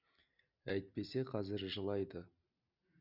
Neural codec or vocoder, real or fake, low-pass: none; real; 5.4 kHz